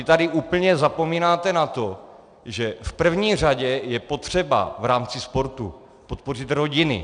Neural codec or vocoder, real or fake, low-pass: none; real; 9.9 kHz